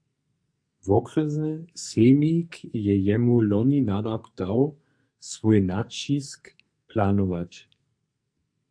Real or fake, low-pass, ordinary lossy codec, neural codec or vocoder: fake; 9.9 kHz; AAC, 64 kbps; codec, 44.1 kHz, 2.6 kbps, SNAC